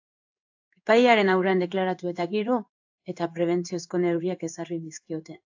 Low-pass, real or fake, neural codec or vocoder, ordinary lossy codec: 7.2 kHz; fake; codec, 16 kHz in and 24 kHz out, 1 kbps, XY-Tokenizer; AAC, 48 kbps